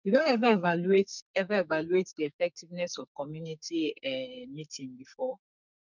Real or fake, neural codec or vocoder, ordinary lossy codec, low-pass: fake; codec, 44.1 kHz, 2.6 kbps, SNAC; none; 7.2 kHz